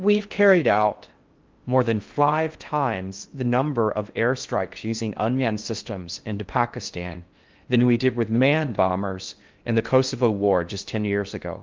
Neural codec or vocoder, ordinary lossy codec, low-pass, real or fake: codec, 16 kHz in and 24 kHz out, 0.6 kbps, FocalCodec, streaming, 4096 codes; Opus, 32 kbps; 7.2 kHz; fake